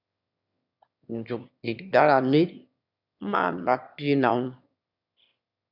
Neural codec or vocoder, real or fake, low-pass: autoencoder, 22.05 kHz, a latent of 192 numbers a frame, VITS, trained on one speaker; fake; 5.4 kHz